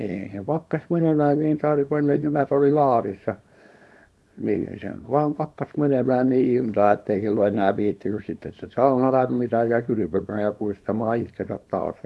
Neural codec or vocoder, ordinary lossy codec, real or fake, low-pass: codec, 24 kHz, 0.9 kbps, WavTokenizer, small release; none; fake; none